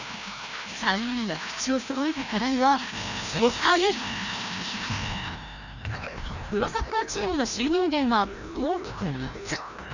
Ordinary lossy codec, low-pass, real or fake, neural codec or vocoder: none; 7.2 kHz; fake; codec, 16 kHz, 1 kbps, FreqCodec, larger model